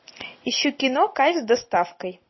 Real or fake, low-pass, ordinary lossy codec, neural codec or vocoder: real; 7.2 kHz; MP3, 24 kbps; none